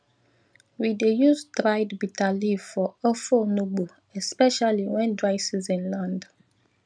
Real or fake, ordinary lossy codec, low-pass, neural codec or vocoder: real; none; 9.9 kHz; none